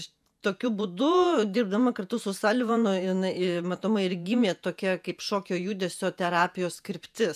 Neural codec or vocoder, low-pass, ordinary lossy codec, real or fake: vocoder, 48 kHz, 128 mel bands, Vocos; 14.4 kHz; MP3, 96 kbps; fake